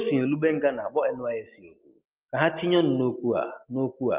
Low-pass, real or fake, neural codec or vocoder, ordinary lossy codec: 3.6 kHz; real; none; Opus, 32 kbps